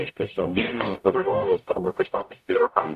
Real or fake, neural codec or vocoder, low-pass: fake; codec, 44.1 kHz, 0.9 kbps, DAC; 14.4 kHz